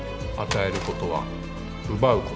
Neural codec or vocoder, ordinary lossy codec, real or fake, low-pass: none; none; real; none